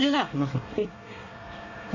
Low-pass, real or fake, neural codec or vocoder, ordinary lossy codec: 7.2 kHz; fake; codec, 24 kHz, 1 kbps, SNAC; none